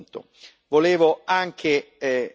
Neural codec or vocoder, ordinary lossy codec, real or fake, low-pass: none; none; real; none